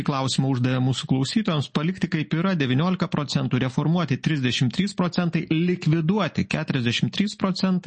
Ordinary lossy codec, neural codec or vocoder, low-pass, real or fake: MP3, 32 kbps; none; 10.8 kHz; real